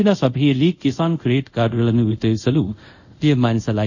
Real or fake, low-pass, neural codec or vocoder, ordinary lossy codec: fake; 7.2 kHz; codec, 24 kHz, 0.5 kbps, DualCodec; none